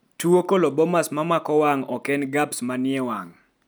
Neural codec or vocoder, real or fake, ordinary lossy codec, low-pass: none; real; none; none